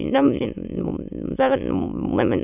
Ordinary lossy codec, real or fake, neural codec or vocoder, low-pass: none; fake; autoencoder, 22.05 kHz, a latent of 192 numbers a frame, VITS, trained on many speakers; 3.6 kHz